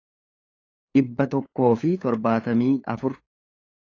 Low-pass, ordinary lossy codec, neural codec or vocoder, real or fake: 7.2 kHz; AAC, 32 kbps; codec, 16 kHz, 8 kbps, FunCodec, trained on LibriTTS, 25 frames a second; fake